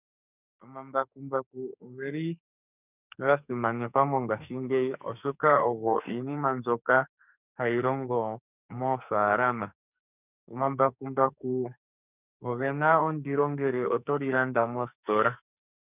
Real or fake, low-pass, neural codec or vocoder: fake; 3.6 kHz; codec, 44.1 kHz, 2.6 kbps, SNAC